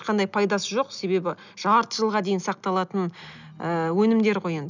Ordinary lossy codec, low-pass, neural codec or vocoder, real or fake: none; 7.2 kHz; none; real